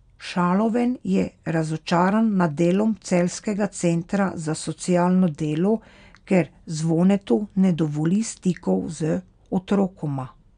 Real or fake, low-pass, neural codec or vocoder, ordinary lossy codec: real; 9.9 kHz; none; none